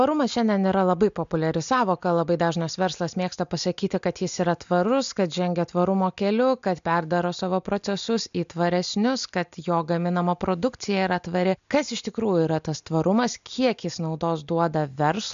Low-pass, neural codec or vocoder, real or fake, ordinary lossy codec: 7.2 kHz; none; real; MP3, 64 kbps